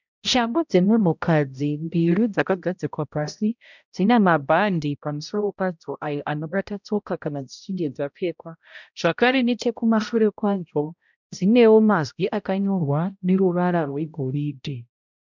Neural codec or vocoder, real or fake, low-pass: codec, 16 kHz, 0.5 kbps, X-Codec, HuBERT features, trained on balanced general audio; fake; 7.2 kHz